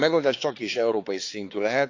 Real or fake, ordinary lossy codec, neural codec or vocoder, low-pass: fake; AAC, 48 kbps; codec, 16 kHz, 4 kbps, X-Codec, HuBERT features, trained on balanced general audio; 7.2 kHz